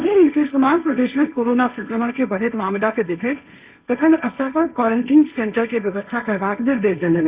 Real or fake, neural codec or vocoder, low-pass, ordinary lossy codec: fake; codec, 16 kHz, 1.1 kbps, Voila-Tokenizer; 3.6 kHz; Opus, 64 kbps